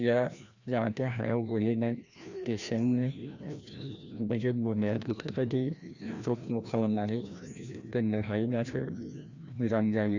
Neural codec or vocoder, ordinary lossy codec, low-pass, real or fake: codec, 16 kHz, 1 kbps, FreqCodec, larger model; Opus, 64 kbps; 7.2 kHz; fake